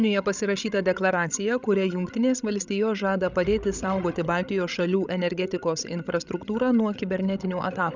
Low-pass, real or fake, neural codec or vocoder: 7.2 kHz; fake; codec, 16 kHz, 16 kbps, FreqCodec, larger model